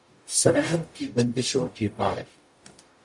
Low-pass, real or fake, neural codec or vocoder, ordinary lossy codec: 10.8 kHz; fake; codec, 44.1 kHz, 0.9 kbps, DAC; MP3, 64 kbps